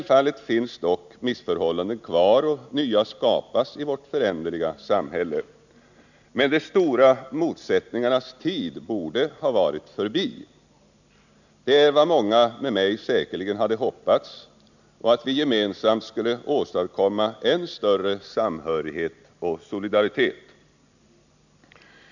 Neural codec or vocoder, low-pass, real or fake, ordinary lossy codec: none; 7.2 kHz; real; none